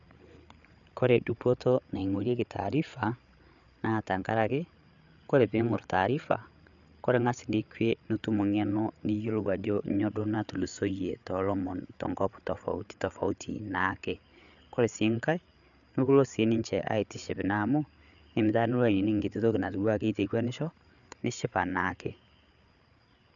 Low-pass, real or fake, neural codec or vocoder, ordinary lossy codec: 7.2 kHz; fake; codec, 16 kHz, 8 kbps, FreqCodec, larger model; none